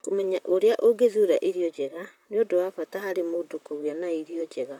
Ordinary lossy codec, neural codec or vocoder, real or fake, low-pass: none; vocoder, 44.1 kHz, 128 mel bands, Pupu-Vocoder; fake; 19.8 kHz